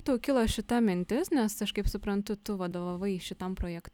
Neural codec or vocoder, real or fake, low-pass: none; real; 19.8 kHz